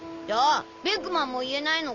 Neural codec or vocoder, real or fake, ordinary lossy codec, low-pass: none; real; none; 7.2 kHz